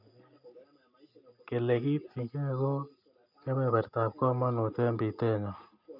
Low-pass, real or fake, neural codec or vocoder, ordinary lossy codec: 5.4 kHz; real; none; none